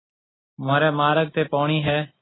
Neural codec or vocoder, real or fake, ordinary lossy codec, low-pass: none; real; AAC, 16 kbps; 7.2 kHz